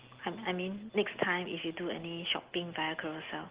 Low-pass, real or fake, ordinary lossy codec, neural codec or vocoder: 3.6 kHz; real; Opus, 16 kbps; none